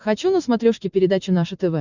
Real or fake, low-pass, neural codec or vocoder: real; 7.2 kHz; none